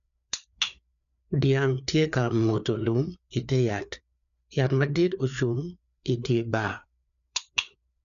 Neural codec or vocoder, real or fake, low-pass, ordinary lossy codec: codec, 16 kHz, 4 kbps, FreqCodec, larger model; fake; 7.2 kHz; none